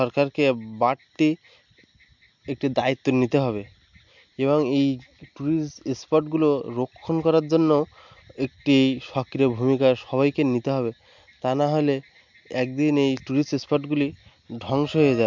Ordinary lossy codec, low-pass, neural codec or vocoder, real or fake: MP3, 64 kbps; 7.2 kHz; none; real